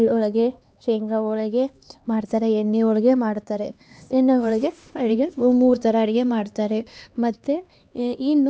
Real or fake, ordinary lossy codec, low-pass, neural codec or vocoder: fake; none; none; codec, 16 kHz, 2 kbps, X-Codec, HuBERT features, trained on LibriSpeech